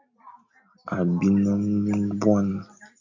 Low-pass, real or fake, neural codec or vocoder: 7.2 kHz; real; none